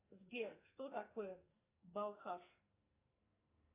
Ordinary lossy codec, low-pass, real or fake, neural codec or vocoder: AAC, 24 kbps; 3.6 kHz; fake; codec, 44.1 kHz, 2.6 kbps, SNAC